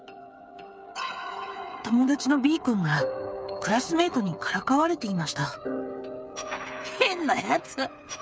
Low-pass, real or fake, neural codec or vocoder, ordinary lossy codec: none; fake; codec, 16 kHz, 8 kbps, FreqCodec, smaller model; none